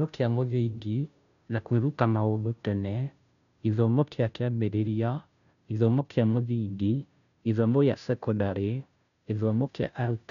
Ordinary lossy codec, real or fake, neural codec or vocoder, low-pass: none; fake; codec, 16 kHz, 0.5 kbps, FunCodec, trained on Chinese and English, 25 frames a second; 7.2 kHz